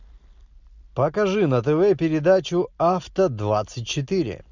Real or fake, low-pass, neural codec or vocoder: real; 7.2 kHz; none